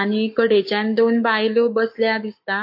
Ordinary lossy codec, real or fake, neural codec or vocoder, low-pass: MP3, 32 kbps; fake; codec, 44.1 kHz, 7.8 kbps, Pupu-Codec; 5.4 kHz